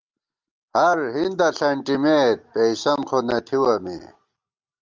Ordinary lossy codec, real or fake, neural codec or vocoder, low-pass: Opus, 32 kbps; real; none; 7.2 kHz